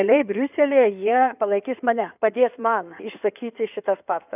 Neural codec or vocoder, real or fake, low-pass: codec, 16 kHz in and 24 kHz out, 2.2 kbps, FireRedTTS-2 codec; fake; 3.6 kHz